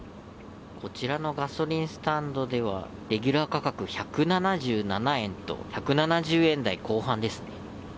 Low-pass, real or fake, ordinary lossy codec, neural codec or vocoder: none; real; none; none